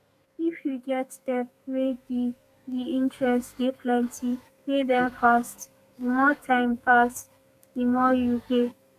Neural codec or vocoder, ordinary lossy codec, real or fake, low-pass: codec, 32 kHz, 1.9 kbps, SNAC; none; fake; 14.4 kHz